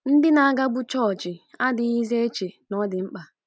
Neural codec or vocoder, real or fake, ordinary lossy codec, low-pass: none; real; none; none